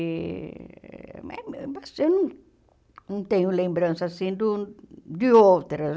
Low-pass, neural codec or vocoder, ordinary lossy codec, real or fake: none; none; none; real